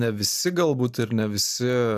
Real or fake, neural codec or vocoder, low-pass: fake; vocoder, 44.1 kHz, 128 mel bands every 512 samples, BigVGAN v2; 14.4 kHz